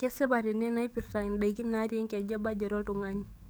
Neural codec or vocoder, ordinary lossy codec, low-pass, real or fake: codec, 44.1 kHz, 7.8 kbps, DAC; none; none; fake